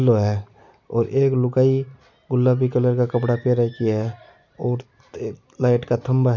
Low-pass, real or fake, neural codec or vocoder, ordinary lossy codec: 7.2 kHz; real; none; none